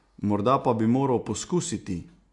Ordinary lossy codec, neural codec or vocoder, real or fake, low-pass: AAC, 64 kbps; none; real; 10.8 kHz